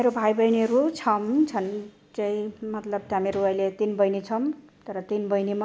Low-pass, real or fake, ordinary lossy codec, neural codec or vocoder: none; real; none; none